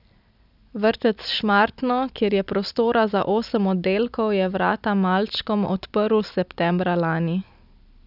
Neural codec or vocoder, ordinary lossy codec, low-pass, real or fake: none; none; 5.4 kHz; real